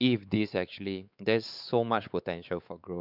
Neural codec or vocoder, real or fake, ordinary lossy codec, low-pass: codec, 16 kHz, 4 kbps, X-Codec, WavLM features, trained on Multilingual LibriSpeech; fake; none; 5.4 kHz